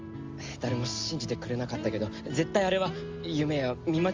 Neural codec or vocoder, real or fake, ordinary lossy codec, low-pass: none; real; Opus, 32 kbps; 7.2 kHz